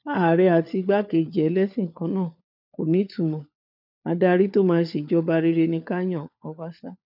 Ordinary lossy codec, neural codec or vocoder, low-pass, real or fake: none; codec, 16 kHz, 4 kbps, FunCodec, trained on LibriTTS, 50 frames a second; 5.4 kHz; fake